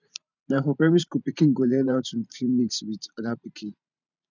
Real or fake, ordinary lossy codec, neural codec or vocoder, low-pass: fake; none; vocoder, 44.1 kHz, 128 mel bands every 512 samples, BigVGAN v2; 7.2 kHz